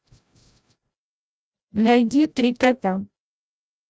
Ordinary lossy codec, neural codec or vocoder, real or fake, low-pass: none; codec, 16 kHz, 0.5 kbps, FreqCodec, larger model; fake; none